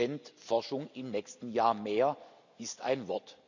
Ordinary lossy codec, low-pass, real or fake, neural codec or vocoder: none; 7.2 kHz; real; none